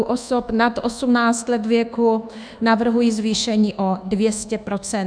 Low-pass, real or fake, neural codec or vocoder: 9.9 kHz; fake; codec, 24 kHz, 1.2 kbps, DualCodec